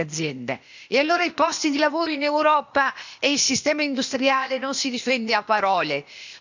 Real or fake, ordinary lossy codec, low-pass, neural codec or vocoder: fake; none; 7.2 kHz; codec, 16 kHz, 0.8 kbps, ZipCodec